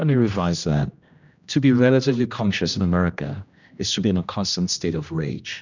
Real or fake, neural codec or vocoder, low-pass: fake; codec, 16 kHz, 1 kbps, X-Codec, HuBERT features, trained on general audio; 7.2 kHz